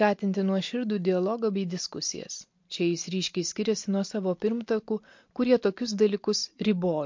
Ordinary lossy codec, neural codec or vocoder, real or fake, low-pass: MP3, 48 kbps; none; real; 7.2 kHz